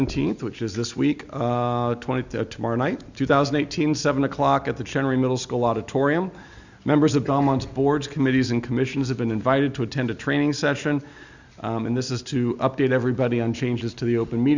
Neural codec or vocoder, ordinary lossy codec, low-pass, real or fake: none; Opus, 64 kbps; 7.2 kHz; real